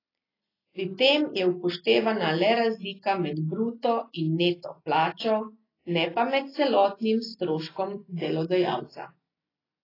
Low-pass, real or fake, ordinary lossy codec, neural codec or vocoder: 5.4 kHz; real; AAC, 24 kbps; none